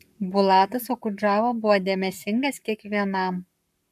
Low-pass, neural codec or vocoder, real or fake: 14.4 kHz; codec, 44.1 kHz, 7.8 kbps, Pupu-Codec; fake